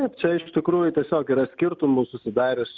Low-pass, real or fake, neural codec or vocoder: 7.2 kHz; real; none